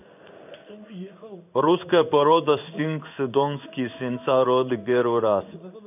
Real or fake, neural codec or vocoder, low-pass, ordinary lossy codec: fake; codec, 16 kHz in and 24 kHz out, 1 kbps, XY-Tokenizer; 3.6 kHz; none